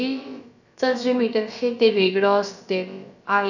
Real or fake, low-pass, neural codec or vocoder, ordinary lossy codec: fake; 7.2 kHz; codec, 16 kHz, about 1 kbps, DyCAST, with the encoder's durations; none